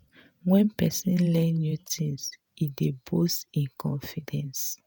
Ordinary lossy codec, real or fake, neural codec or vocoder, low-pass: none; fake; vocoder, 48 kHz, 128 mel bands, Vocos; none